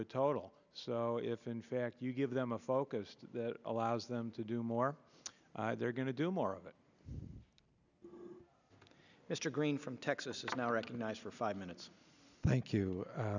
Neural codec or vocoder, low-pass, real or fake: none; 7.2 kHz; real